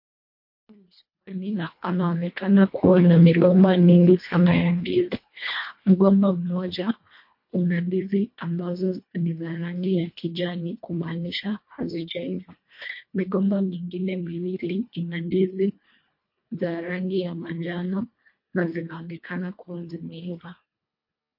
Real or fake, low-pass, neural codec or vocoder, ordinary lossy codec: fake; 5.4 kHz; codec, 24 kHz, 1.5 kbps, HILCodec; MP3, 32 kbps